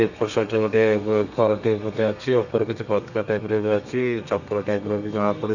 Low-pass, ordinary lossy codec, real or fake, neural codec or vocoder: 7.2 kHz; none; fake; codec, 32 kHz, 1.9 kbps, SNAC